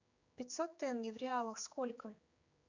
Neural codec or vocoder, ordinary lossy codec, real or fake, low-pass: codec, 16 kHz, 4 kbps, X-Codec, HuBERT features, trained on general audio; Opus, 64 kbps; fake; 7.2 kHz